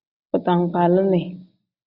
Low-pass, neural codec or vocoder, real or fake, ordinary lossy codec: 5.4 kHz; none; real; Opus, 64 kbps